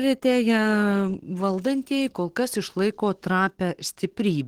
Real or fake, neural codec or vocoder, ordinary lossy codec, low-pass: fake; codec, 44.1 kHz, 7.8 kbps, DAC; Opus, 16 kbps; 19.8 kHz